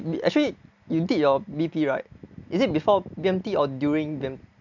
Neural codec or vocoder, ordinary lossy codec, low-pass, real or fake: none; none; 7.2 kHz; real